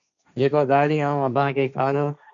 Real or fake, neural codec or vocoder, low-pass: fake; codec, 16 kHz, 1.1 kbps, Voila-Tokenizer; 7.2 kHz